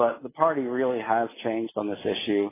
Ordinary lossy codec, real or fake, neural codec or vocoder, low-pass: AAC, 16 kbps; real; none; 3.6 kHz